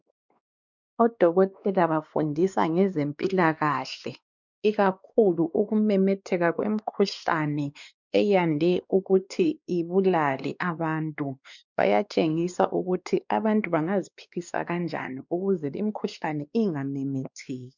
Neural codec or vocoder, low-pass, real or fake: codec, 16 kHz, 2 kbps, X-Codec, WavLM features, trained on Multilingual LibriSpeech; 7.2 kHz; fake